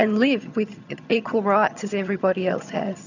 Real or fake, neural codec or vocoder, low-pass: fake; vocoder, 22.05 kHz, 80 mel bands, HiFi-GAN; 7.2 kHz